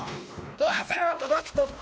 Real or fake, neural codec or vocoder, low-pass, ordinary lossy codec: fake; codec, 16 kHz, 1 kbps, X-Codec, WavLM features, trained on Multilingual LibriSpeech; none; none